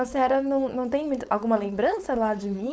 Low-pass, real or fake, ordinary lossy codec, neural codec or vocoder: none; fake; none; codec, 16 kHz, 4.8 kbps, FACodec